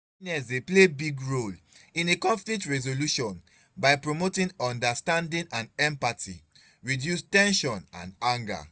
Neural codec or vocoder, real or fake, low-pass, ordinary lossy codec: none; real; none; none